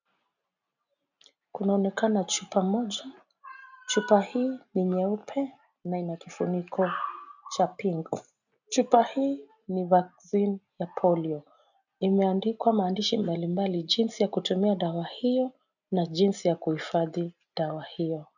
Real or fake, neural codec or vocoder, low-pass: real; none; 7.2 kHz